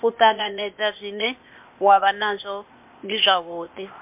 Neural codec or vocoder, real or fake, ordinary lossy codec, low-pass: codec, 16 kHz, 0.8 kbps, ZipCodec; fake; MP3, 32 kbps; 3.6 kHz